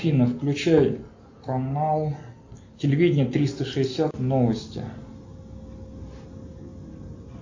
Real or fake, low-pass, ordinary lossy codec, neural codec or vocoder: real; 7.2 kHz; AAC, 48 kbps; none